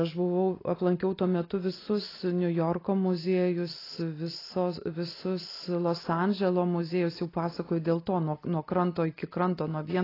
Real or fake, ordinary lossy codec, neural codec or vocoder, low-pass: real; AAC, 24 kbps; none; 5.4 kHz